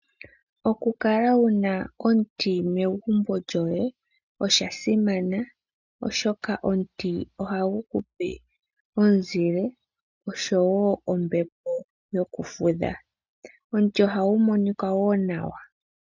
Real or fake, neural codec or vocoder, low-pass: real; none; 7.2 kHz